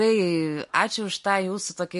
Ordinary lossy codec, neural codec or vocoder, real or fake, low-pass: MP3, 48 kbps; none; real; 14.4 kHz